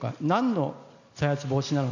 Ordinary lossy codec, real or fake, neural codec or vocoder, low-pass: none; real; none; 7.2 kHz